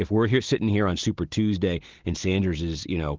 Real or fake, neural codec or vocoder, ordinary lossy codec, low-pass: real; none; Opus, 16 kbps; 7.2 kHz